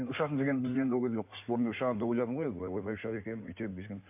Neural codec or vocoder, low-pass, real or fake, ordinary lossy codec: codec, 16 kHz in and 24 kHz out, 2.2 kbps, FireRedTTS-2 codec; 3.6 kHz; fake; MP3, 32 kbps